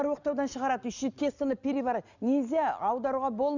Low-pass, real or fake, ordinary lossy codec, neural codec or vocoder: 7.2 kHz; real; none; none